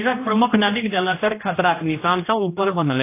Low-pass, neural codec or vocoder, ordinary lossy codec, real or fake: 3.6 kHz; codec, 16 kHz, 1 kbps, X-Codec, HuBERT features, trained on general audio; AAC, 24 kbps; fake